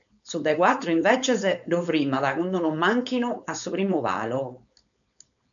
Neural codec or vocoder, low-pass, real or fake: codec, 16 kHz, 4.8 kbps, FACodec; 7.2 kHz; fake